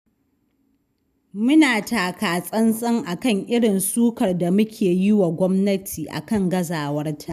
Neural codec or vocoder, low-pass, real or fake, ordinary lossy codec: none; 14.4 kHz; real; none